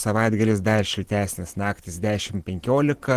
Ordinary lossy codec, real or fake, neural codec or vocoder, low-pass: Opus, 16 kbps; fake; vocoder, 44.1 kHz, 128 mel bands every 512 samples, BigVGAN v2; 14.4 kHz